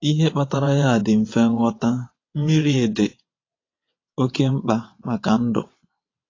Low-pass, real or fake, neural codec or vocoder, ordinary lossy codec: 7.2 kHz; fake; vocoder, 22.05 kHz, 80 mel bands, WaveNeXt; AAC, 32 kbps